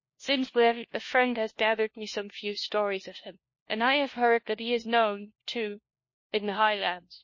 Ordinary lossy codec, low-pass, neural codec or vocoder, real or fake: MP3, 32 kbps; 7.2 kHz; codec, 16 kHz, 1 kbps, FunCodec, trained on LibriTTS, 50 frames a second; fake